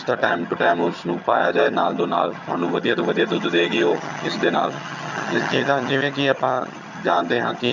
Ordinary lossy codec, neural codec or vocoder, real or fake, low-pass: none; vocoder, 22.05 kHz, 80 mel bands, HiFi-GAN; fake; 7.2 kHz